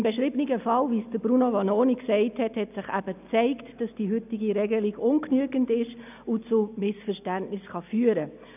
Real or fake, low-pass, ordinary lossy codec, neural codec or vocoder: real; 3.6 kHz; none; none